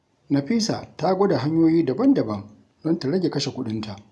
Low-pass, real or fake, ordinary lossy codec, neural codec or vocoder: none; real; none; none